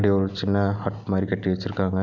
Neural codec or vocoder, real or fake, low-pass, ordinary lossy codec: none; real; 7.2 kHz; none